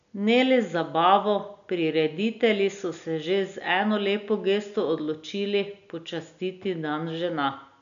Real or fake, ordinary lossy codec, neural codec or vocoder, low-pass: real; none; none; 7.2 kHz